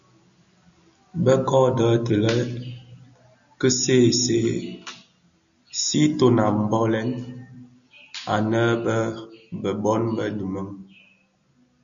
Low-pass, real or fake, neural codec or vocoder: 7.2 kHz; real; none